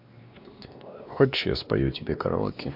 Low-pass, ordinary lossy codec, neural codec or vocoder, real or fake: 5.4 kHz; MP3, 48 kbps; codec, 16 kHz, 2 kbps, X-Codec, WavLM features, trained on Multilingual LibriSpeech; fake